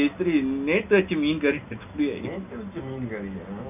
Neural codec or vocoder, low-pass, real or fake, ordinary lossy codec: none; 3.6 kHz; real; none